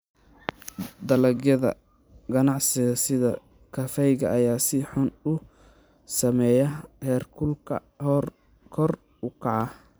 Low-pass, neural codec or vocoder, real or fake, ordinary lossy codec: none; vocoder, 44.1 kHz, 128 mel bands every 256 samples, BigVGAN v2; fake; none